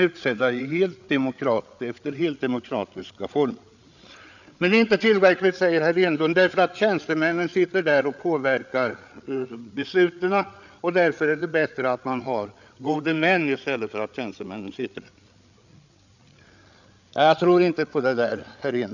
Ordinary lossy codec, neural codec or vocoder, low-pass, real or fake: none; codec, 16 kHz, 8 kbps, FreqCodec, larger model; 7.2 kHz; fake